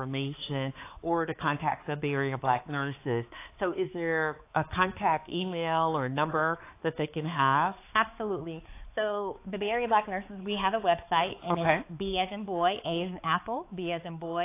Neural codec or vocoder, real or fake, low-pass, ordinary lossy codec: codec, 16 kHz, 4 kbps, X-Codec, HuBERT features, trained on balanced general audio; fake; 3.6 kHz; AAC, 24 kbps